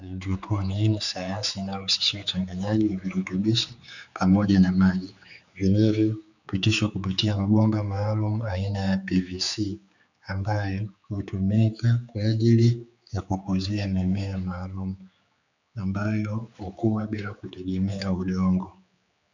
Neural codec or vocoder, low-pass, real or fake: codec, 16 kHz, 4 kbps, X-Codec, HuBERT features, trained on general audio; 7.2 kHz; fake